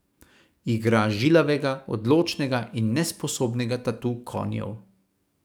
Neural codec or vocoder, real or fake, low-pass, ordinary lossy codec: codec, 44.1 kHz, 7.8 kbps, DAC; fake; none; none